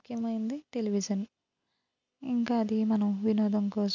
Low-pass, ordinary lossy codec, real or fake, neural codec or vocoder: 7.2 kHz; none; real; none